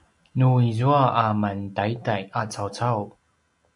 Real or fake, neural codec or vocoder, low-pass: real; none; 10.8 kHz